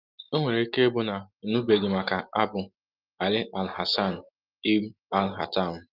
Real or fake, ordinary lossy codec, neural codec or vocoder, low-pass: real; Opus, 32 kbps; none; 5.4 kHz